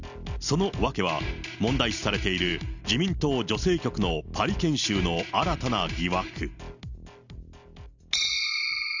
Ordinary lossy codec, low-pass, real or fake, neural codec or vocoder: none; 7.2 kHz; real; none